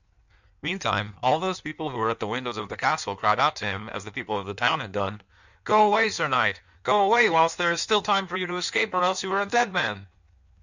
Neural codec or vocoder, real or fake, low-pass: codec, 16 kHz in and 24 kHz out, 1.1 kbps, FireRedTTS-2 codec; fake; 7.2 kHz